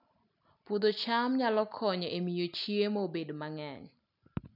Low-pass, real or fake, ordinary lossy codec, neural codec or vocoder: 5.4 kHz; real; none; none